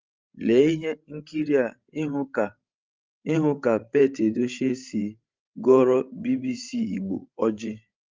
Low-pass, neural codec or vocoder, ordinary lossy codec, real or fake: 7.2 kHz; codec, 16 kHz, 16 kbps, FreqCodec, larger model; Opus, 32 kbps; fake